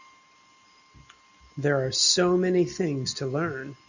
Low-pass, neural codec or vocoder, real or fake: 7.2 kHz; none; real